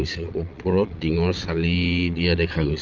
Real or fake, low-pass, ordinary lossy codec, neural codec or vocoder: fake; 7.2 kHz; Opus, 32 kbps; vocoder, 22.05 kHz, 80 mel bands, Vocos